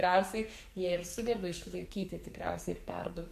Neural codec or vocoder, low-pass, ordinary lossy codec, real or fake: codec, 44.1 kHz, 2.6 kbps, SNAC; 14.4 kHz; MP3, 64 kbps; fake